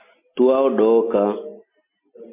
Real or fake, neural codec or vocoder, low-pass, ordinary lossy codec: real; none; 3.6 kHz; MP3, 24 kbps